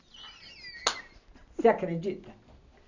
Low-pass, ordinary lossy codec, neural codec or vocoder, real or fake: 7.2 kHz; none; none; real